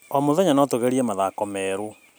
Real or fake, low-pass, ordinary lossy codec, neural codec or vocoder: real; none; none; none